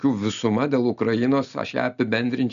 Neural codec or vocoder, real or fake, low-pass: none; real; 7.2 kHz